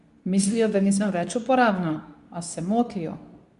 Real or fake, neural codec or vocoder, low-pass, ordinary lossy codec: fake; codec, 24 kHz, 0.9 kbps, WavTokenizer, medium speech release version 2; 10.8 kHz; none